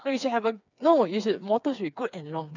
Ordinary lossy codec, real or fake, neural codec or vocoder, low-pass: none; fake; codec, 16 kHz, 4 kbps, FreqCodec, smaller model; 7.2 kHz